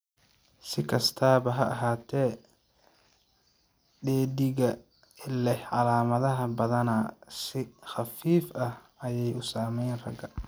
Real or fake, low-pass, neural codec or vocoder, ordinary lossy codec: real; none; none; none